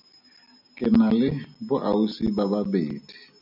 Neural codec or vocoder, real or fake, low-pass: none; real; 5.4 kHz